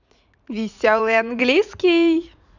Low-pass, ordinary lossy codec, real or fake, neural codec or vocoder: 7.2 kHz; none; fake; vocoder, 44.1 kHz, 80 mel bands, Vocos